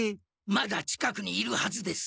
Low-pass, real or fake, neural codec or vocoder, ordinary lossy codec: none; real; none; none